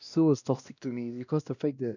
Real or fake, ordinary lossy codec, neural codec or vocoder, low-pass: fake; none; codec, 16 kHz, 1 kbps, X-Codec, WavLM features, trained on Multilingual LibriSpeech; 7.2 kHz